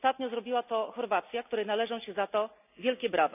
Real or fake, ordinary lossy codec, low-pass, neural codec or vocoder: real; none; 3.6 kHz; none